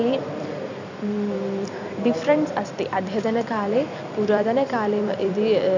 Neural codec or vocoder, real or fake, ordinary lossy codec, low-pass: vocoder, 44.1 kHz, 128 mel bands every 256 samples, BigVGAN v2; fake; none; 7.2 kHz